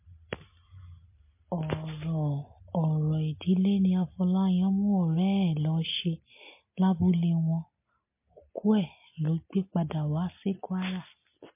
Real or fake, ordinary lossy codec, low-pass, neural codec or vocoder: real; MP3, 24 kbps; 3.6 kHz; none